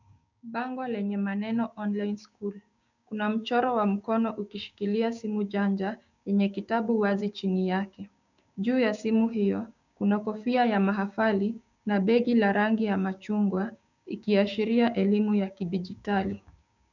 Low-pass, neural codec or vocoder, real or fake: 7.2 kHz; codec, 16 kHz, 6 kbps, DAC; fake